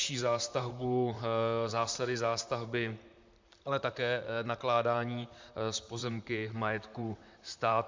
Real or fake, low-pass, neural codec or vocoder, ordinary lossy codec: fake; 7.2 kHz; vocoder, 44.1 kHz, 128 mel bands, Pupu-Vocoder; MP3, 64 kbps